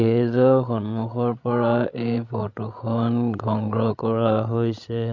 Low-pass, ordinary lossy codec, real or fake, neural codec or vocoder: 7.2 kHz; MP3, 64 kbps; fake; codec, 16 kHz, 8 kbps, FreqCodec, larger model